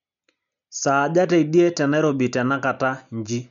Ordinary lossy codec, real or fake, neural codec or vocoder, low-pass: none; real; none; 7.2 kHz